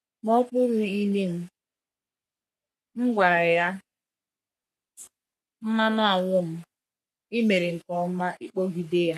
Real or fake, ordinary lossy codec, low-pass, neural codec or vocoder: fake; none; 14.4 kHz; codec, 44.1 kHz, 3.4 kbps, Pupu-Codec